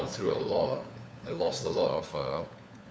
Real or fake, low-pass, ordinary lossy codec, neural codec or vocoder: fake; none; none; codec, 16 kHz, 4 kbps, FunCodec, trained on LibriTTS, 50 frames a second